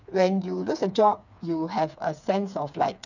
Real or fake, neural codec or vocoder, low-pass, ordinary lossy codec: fake; codec, 16 kHz, 4 kbps, FreqCodec, smaller model; 7.2 kHz; none